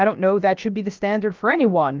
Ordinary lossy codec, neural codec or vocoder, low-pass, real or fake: Opus, 32 kbps; codec, 16 kHz, about 1 kbps, DyCAST, with the encoder's durations; 7.2 kHz; fake